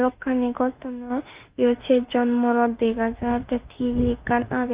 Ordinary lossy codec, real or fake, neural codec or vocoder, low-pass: Opus, 32 kbps; fake; autoencoder, 48 kHz, 32 numbers a frame, DAC-VAE, trained on Japanese speech; 3.6 kHz